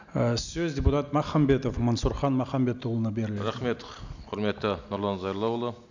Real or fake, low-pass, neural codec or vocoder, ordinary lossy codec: real; 7.2 kHz; none; none